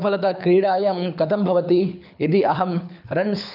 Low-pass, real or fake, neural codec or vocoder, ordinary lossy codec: 5.4 kHz; fake; codec, 24 kHz, 6 kbps, HILCodec; none